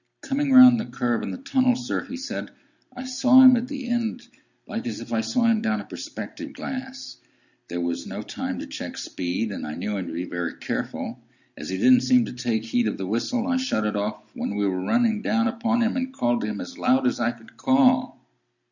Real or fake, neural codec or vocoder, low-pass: real; none; 7.2 kHz